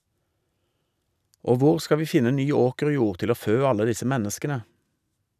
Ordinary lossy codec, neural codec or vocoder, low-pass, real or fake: none; none; 14.4 kHz; real